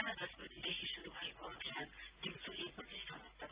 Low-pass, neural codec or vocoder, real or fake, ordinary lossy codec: 3.6 kHz; none; real; Opus, 32 kbps